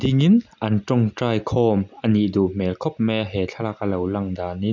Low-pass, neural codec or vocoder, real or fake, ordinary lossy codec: 7.2 kHz; none; real; none